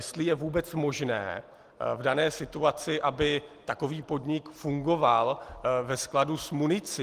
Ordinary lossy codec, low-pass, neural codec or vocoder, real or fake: Opus, 24 kbps; 14.4 kHz; none; real